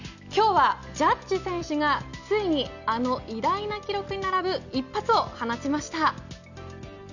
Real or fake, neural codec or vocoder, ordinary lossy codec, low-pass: real; none; none; 7.2 kHz